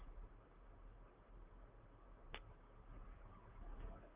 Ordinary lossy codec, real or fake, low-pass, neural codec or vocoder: Opus, 24 kbps; fake; 3.6 kHz; vocoder, 44.1 kHz, 128 mel bands, Pupu-Vocoder